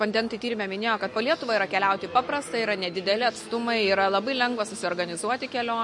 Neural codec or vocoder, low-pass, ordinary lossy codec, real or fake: none; 10.8 kHz; MP3, 48 kbps; real